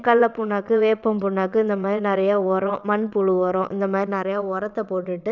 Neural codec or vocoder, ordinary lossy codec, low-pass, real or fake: vocoder, 22.05 kHz, 80 mel bands, WaveNeXt; none; 7.2 kHz; fake